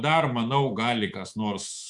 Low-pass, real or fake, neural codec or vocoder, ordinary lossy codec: 10.8 kHz; fake; codec, 24 kHz, 3.1 kbps, DualCodec; Opus, 64 kbps